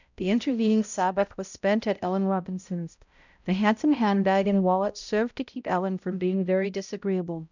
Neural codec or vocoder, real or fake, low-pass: codec, 16 kHz, 0.5 kbps, X-Codec, HuBERT features, trained on balanced general audio; fake; 7.2 kHz